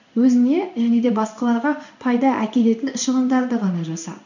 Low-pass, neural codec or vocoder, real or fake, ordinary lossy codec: 7.2 kHz; codec, 16 kHz in and 24 kHz out, 1 kbps, XY-Tokenizer; fake; none